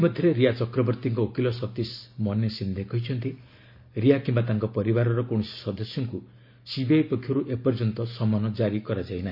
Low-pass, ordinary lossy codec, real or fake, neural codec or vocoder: 5.4 kHz; MP3, 32 kbps; real; none